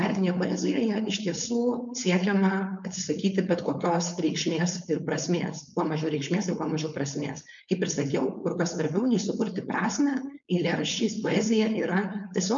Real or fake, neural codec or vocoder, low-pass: fake; codec, 16 kHz, 4.8 kbps, FACodec; 7.2 kHz